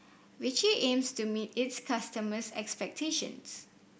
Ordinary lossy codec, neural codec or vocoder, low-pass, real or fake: none; none; none; real